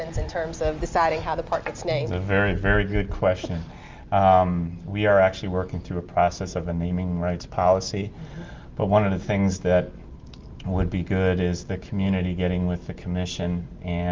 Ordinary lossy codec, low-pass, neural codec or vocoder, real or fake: Opus, 32 kbps; 7.2 kHz; none; real